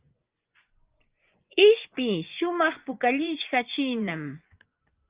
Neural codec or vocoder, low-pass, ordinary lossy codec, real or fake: vocoder, 24 kHz, 100 mel bands, Vocos; 3.6 kHz; Opus, 24 kbps; fake